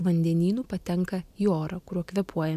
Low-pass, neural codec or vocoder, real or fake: 14.4 kHz; none; real